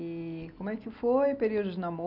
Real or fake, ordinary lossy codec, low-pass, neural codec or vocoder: real; none; 5.4 kHz; none